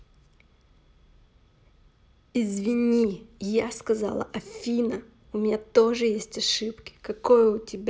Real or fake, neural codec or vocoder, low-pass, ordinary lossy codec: real; none; none; none